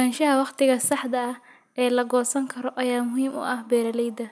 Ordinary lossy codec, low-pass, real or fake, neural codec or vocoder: none; none; real; none